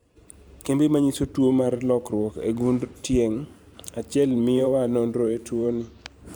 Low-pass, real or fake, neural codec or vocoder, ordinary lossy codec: none; fake; vocoder, 44.1 kHz, 128 mel bands every 512 samples, BigVGAN v2; none